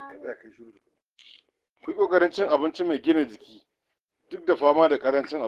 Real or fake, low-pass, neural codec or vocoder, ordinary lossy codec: fake; 14.4 kHz; codec, 44.1 kHz, 7.8 kbps, DAC; Opus, 16 kbps